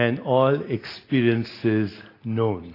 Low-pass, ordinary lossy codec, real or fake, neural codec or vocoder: 5.4 kHz; AAC, 24 kbps; real; none